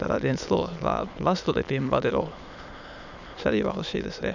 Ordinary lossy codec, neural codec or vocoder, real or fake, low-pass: none; autoencoder, 22.05 kHz, a latent of 192 numbers a frame, VITS, trained on many speakers; fake; 7.2 kHz